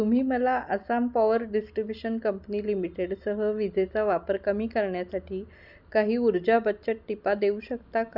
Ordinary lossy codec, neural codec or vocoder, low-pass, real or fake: none; none; 5.4 kHz; real